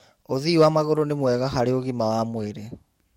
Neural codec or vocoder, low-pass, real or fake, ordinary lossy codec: codec, 44.1 kHz, 7.8 kbps, DAC; 19.8 kHz; fake; MP3, 64 kbps